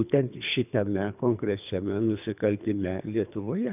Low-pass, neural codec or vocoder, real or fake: 3.6 kHz; codec, 24 kHz, 3 kbps, HILCodec; fake